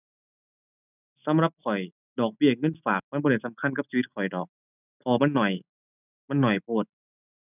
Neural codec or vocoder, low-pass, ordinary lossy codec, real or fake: none; 3.6 kHz; none; real